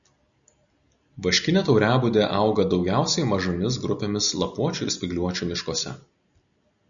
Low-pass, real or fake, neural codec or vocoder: 7.2 kHz; real; none